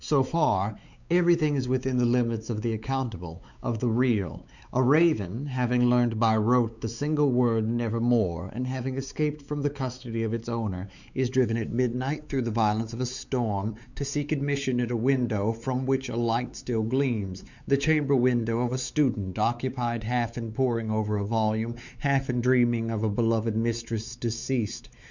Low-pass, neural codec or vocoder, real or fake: 7.2 kHz; codec, 16 kHz, 4 kbps, X-Codec, WavLM features, trained on Multilingual LibriSpeech; fake